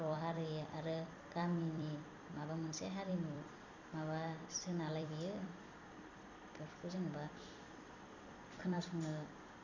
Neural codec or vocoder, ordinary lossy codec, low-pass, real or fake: vocoder, 44.1 kHz, 128 mel bands every 256 samples, BigVGAN v2; none; 7.2 kHz; fake